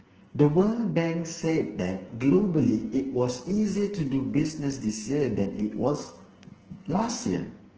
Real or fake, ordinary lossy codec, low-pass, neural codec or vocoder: fake; Opus, 16 kbps; 7.2 kHz; codec, 44.1 kHz, 2.6 kbps, SNAC